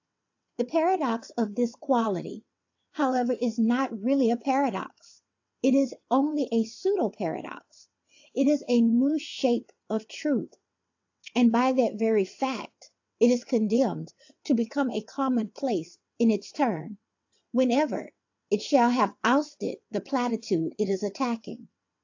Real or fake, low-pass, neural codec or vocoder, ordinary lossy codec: fake; 7.2 kHz; vocoder, 22.05 kHz, 80 mel bands, WaveNeXt; AAC, 48 kbps